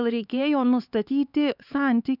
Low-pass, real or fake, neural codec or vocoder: 5.4 kHz; fake; codec, 16 kHz, 4 kbps, X-Codec, HuBERT features, trained on LibriSpeech